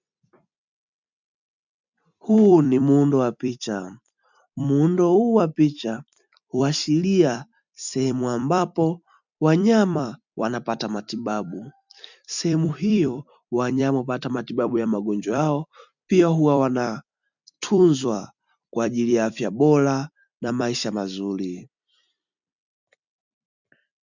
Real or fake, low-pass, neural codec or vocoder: fake; 7.2 kHz; vocoder, 44.1 kHz, 128 mel bands every 256 samples, BigVGAN v2